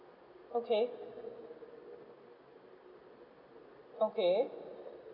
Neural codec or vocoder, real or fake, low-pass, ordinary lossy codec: none; real; 5.4 kHz; none